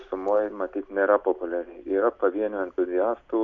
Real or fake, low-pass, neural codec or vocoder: real; 7.2 kHz; none